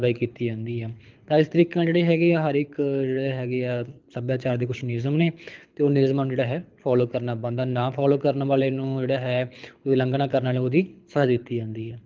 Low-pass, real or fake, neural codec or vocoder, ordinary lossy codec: 7.2 kHz; fake; codec, 24 kHz, 6 kbps, HILCodec; Opus, 32 kbps